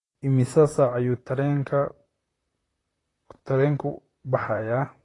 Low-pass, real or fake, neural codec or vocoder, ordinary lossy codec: 10.8 kHz; fake; vocoder, 44.1 kHz, 128 mel bands, Pupu-Vocoder; AAC, 32 kbps